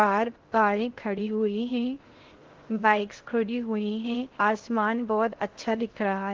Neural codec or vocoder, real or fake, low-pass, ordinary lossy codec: codec, 16 kHz in and 24 kHz out, 0.6 kbps, FocalCodec, streaming, 2048 codes; fake; 7.2 kHz; Opus, 16 kbps